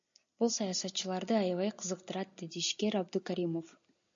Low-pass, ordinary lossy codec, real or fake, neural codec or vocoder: 7.2 kHz; AAC, 64 kbps; real; none